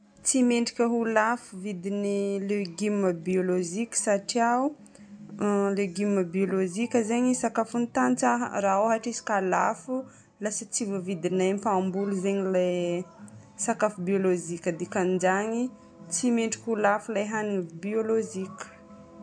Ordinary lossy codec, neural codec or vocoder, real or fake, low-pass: MP3, 64 kbps; none; real; 9.9 kHz